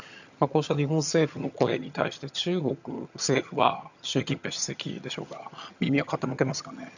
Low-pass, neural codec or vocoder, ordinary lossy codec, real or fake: 7.2 kHz; vocoder, 22.05 kHz, 80 mel bands, HiFi-GAN; none; fake